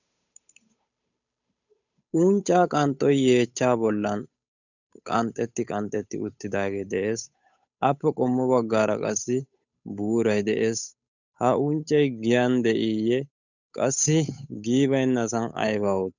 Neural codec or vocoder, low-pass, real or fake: codec, 16 kHz, 8 kbps, FunCodec, trained on Chinese and English, 25 frames a second; 7.2 kHz; fake